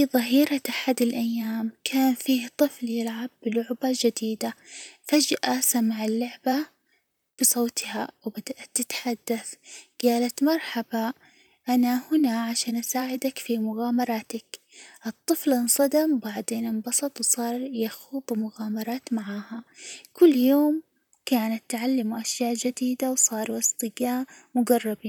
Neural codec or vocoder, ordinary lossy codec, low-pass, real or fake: vocoder, 44.1 kHz, 128 mel bands, Pupu-Vocoder; none; none; fake